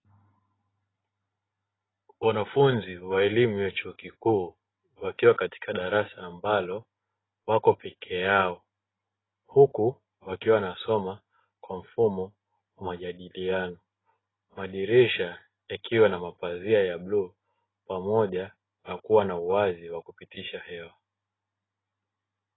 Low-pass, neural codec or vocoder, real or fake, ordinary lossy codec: 7.2 kHz; none; real; AAC, 16 kbps